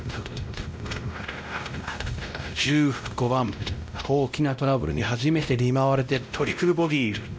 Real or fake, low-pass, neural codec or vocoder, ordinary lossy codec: fake; none; codec, 16 kHz, 0.5 kbps, X-Codec, WavLM features, trained on Multilingual LibriSpeech; none